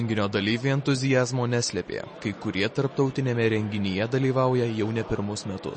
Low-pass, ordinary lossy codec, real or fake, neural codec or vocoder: 10.8 kHz; MP3, 32 kbps; real; none